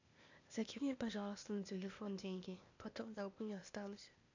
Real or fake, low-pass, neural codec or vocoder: fake; 7.2 kHz; codec, 16 kHz, 0.8 kbps, ZipCodec